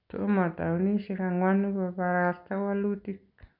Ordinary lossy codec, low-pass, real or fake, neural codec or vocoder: none; 5.4 kHz; real; none